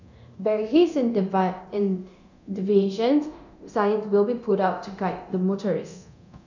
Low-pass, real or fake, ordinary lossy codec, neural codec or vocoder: 7.2 kHz; fake; none; codec, 24 kHz, 0.9 kbps, DualCodec